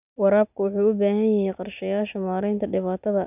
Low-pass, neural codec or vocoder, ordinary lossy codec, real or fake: 3.6 kHz; none; none; real